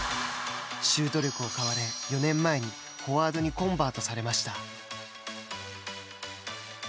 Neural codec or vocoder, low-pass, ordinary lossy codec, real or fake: none; none; none; real